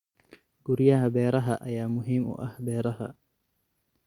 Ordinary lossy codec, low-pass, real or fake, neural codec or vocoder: Opus, 64 kbps; 19.8 kHz; real; none